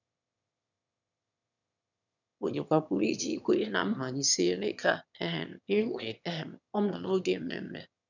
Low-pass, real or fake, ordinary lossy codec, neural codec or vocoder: 7.2 kHz; fake; none; autoencoder, 22.05 kHz, a latent of 192 numbers a frame, VITS, trained on one speaker